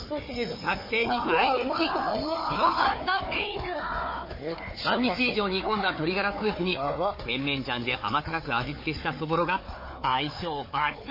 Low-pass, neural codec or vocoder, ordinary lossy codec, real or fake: 5.4 kHz; codec, 16 kHz, 4 kbps, FunCodec, trained on Chinese and English, 50 frames a second; MP3, 24 kbps; fake